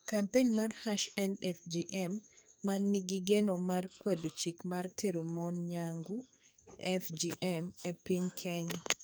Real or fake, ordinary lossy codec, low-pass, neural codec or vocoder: fake; none; none; codec, 44.1 kHz, 2.6 kbps, SNAC